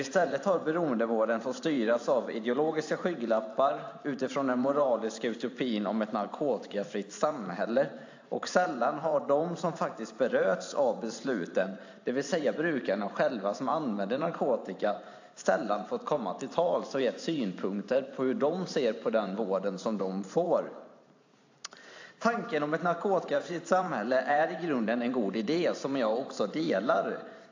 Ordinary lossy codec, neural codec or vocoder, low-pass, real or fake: AAC, 48 kbps; vocoder, 44.1 kHz, 128 mel bands every 512 samples, BigVGAN v2; 7.2 kHz; fake